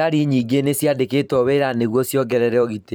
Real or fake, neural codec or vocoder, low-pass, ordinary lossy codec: fake; vocoder, 44.1 kHz, 128 mel bands every 512 samples, BigVGAN v2; none; none